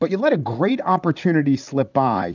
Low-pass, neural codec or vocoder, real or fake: 7.2 kHz; vocoder, 44.1 kHz, 128 mel bands, Pupu-Vocoder; fake